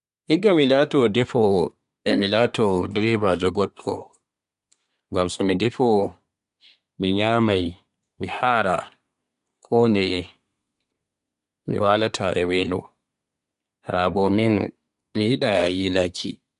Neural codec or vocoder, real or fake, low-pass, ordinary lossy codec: codec, 24 kHz, 1 kbps, SNAC; fake; 10.8 kHz; none